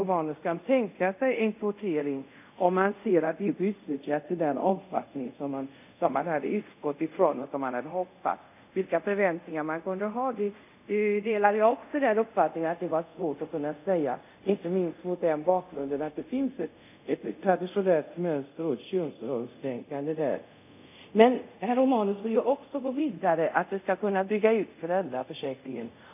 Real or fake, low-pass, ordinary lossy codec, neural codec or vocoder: fake; 3.6 kHz; none; codec, 24 kHz, 0.5 kbps, DualCodec